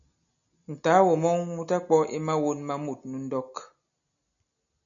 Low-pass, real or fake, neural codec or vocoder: 7.2 kHz; real; none